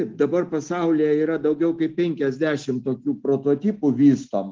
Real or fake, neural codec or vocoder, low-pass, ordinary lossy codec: real; none; 7.2 kHz; Opus, 16 kbps